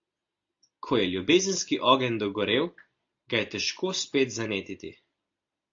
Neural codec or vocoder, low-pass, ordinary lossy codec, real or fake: none; 7.2 kHz; AAC, 48 kbps; real